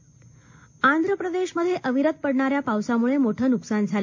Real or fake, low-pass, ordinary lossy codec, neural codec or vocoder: real; 7.2 kHz; MP3, 48 kbps; none